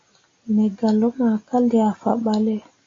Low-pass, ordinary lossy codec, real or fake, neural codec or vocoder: 7.2 kHz; AAC, 32 kbps; real; none